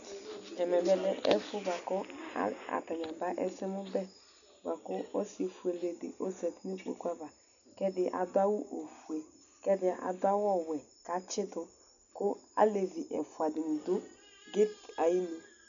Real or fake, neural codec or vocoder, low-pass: real; none; 7.2 kHz